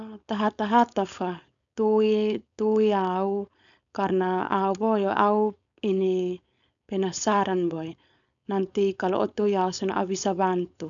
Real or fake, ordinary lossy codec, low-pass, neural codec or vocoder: fake; none; 7.2 kHz; codec, 16 kHz, 4.8 kbps, FACodec